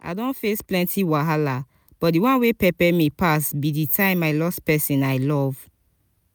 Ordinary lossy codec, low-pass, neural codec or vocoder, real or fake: none; none; none; real